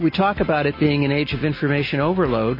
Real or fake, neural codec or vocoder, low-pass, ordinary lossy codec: real; none; 5.4 kHz; MP3, 24 kbps